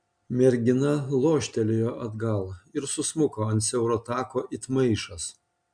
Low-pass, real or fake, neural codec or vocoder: 9.9 kHz; real; none